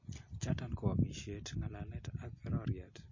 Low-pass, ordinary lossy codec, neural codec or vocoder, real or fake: 7.2 kHz; MP3, 32 kbps; none; real